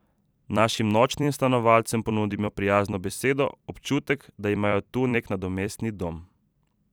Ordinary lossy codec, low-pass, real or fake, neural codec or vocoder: none; none; fake; vocoder, 44.1 kHz, 128 mel bands every 256 samples, BigVGAN v2